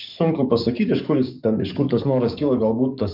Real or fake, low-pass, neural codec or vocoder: fake; 5.4 kHz; codec, 44.1 kHz, 7.8 kbps, DAC